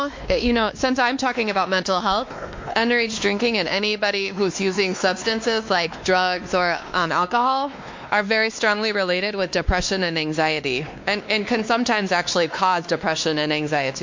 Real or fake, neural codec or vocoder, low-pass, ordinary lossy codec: fake; codec, 16 kHz, 2 kbps, X-Codec, WavLM features, trained on Multilingual LibriSpeech; 7.2 kHz; MP3, 48 kbps